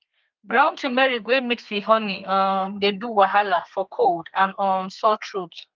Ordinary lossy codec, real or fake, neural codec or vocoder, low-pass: Opus, 32 kbps; fake; codec, 32 kHz, 1.9 kbps, SNAC; 7.2 kHz